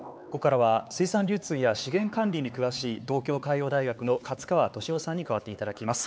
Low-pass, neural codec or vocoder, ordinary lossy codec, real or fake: none; codec, 16 kHz, 4 kbps, X-Codec, HuBERT features, trained on LibriSpeech; none; fake